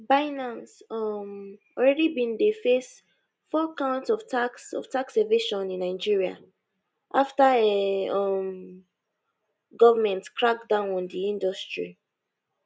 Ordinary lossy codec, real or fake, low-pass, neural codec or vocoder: none; real; none; none